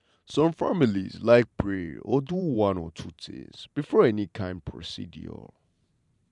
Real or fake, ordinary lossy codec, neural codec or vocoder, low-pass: fake; none; vocoder, 44.1 kHz, 128 mel bands every 512 samples, BigVGAN v2; 10.8 kHz